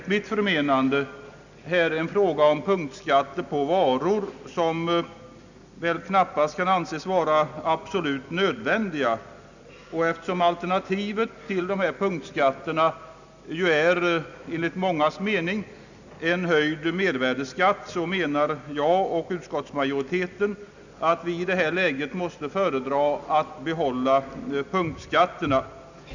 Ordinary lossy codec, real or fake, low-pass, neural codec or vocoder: none; real; 7.2 kHz; none